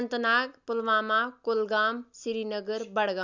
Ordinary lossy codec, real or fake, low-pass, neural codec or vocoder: none; real; 7.2 kHz; none